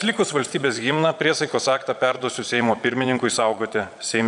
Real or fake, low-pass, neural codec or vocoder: fake; 9.9 kHz; vocoder, 22.05 kHz, 80 mel bands, Vocos